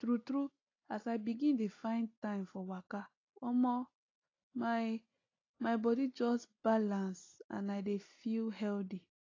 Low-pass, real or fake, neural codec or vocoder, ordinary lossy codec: 7.2 kHz; real; none; AAC, 32 kbps